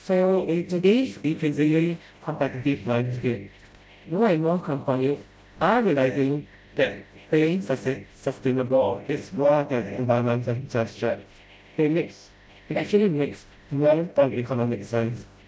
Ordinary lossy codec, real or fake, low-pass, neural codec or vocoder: none; fake; none; codec, 16 kHz, 0.5 kbps, FreqCodec, smaller model